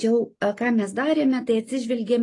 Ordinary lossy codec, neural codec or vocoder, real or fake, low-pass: AAC, 32 kbps; none; real; 10.8 kHz